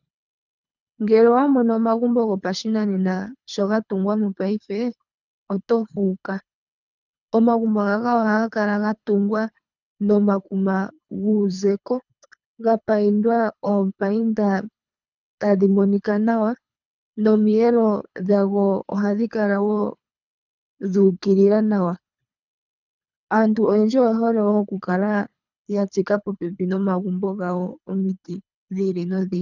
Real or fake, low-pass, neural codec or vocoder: fake; 7.2 kHz; codec, 24 kHz, 3 kbps, HILCodec